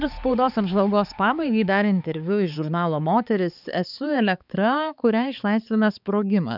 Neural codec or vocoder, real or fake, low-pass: codec, 16 kHz, 4 kbps, X-Codec, HuBERT features, trained on balanced general audio; fake; 5.4 kHz